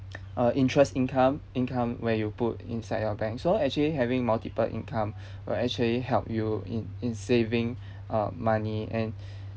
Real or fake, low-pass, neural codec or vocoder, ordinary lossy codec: real; none; none; none